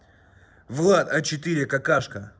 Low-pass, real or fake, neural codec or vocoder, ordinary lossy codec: none; real; none; none